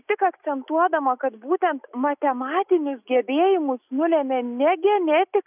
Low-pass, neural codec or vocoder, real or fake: 3.6 kHz; none; real